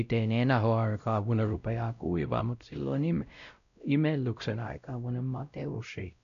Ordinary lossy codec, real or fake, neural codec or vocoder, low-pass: none; fake; codec, 16 kHz, 0.5 kbps, X-Codec, WavLM features, trained on Multilingual LibriSpeech; 7.2 kHz